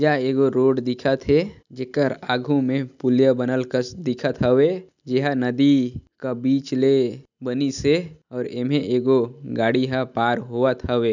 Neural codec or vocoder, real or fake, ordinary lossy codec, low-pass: none; real; none; 7.2 kHz